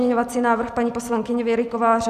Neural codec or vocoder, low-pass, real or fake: none; 14.4 kHz; real